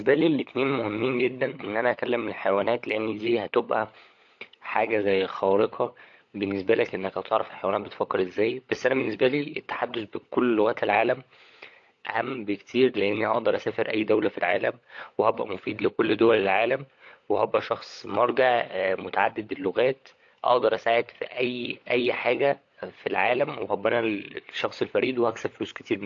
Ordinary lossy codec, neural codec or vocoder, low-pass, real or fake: AAC, 48 kbps; codec, 16 kHz, 16 kbps, FunCodec, trained on LibriTTS, 50 frames a second; 7.2 kHz; fake